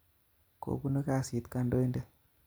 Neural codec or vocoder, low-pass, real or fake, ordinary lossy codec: none; none; real; none